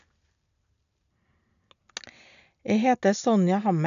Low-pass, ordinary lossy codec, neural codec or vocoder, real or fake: 7.2 kHz; none; none; real